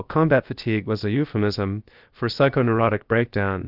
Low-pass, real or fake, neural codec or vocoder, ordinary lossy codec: 5.4 kHz; fake; codec, 16 kHz, about 1 kbps, DyCAST, with the encoder's durations; Opus, 32 kbps